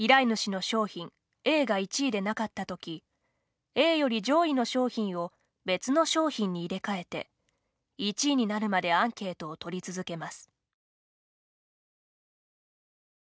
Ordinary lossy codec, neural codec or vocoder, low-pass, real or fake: none; none; none; real